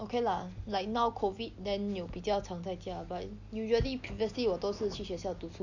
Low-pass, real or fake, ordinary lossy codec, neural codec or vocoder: 7.2 kHz; real; none; none